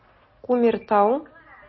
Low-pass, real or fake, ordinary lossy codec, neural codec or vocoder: 7.2 kHz; real; MP3, 24 kbps; none